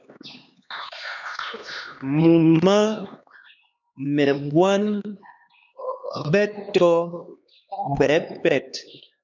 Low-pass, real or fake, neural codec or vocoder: 7.2 kHz; fake; codec, 16 kHz, 2 kbps, X-Codec, HuBERT features, trained on LibriSpeech